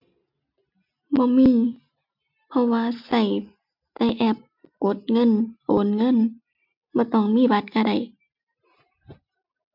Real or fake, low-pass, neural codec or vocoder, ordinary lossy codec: real; 5.4 kHz; none; MP3, 48 kbps